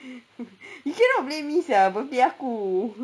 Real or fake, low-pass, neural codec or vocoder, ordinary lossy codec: real; none; none; none